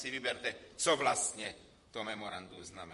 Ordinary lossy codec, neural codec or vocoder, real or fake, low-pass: MP3, 48 kbps; vocoder, 44.1 kHz, 128 mel bands, Pupu-Vocoder; fake; 14.4 kHz